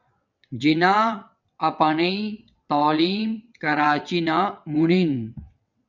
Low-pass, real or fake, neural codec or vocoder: 7.2 kHz; fake; vocoder, 22.05 kHz, 80 mel bands, WaveNeXt